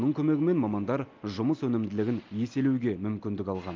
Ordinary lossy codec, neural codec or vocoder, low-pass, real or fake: Opus, 32 kbps; none; 7.2 kHz; real